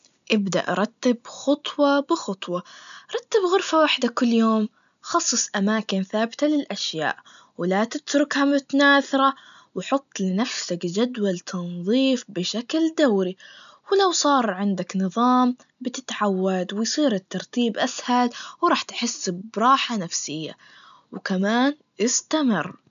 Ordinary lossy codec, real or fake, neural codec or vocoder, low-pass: none; real; none; 7.2 kHz